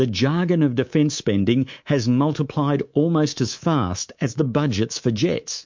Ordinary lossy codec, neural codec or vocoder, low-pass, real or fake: MP3, 48 kbps; codec, 24 kHz, 3.1 kbps, DualCodec; 7.2 kHz; fake